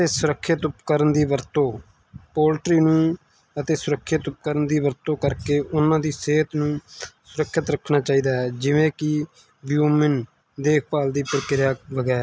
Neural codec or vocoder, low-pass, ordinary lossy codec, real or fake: none; none; none; real